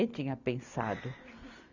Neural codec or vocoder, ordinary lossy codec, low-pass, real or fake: none; none; 7.2 kHz; real